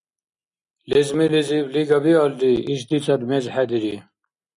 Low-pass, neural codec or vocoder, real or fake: 10.8 kHz; none; real